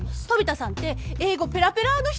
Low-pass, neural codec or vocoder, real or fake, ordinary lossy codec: none; none; real; none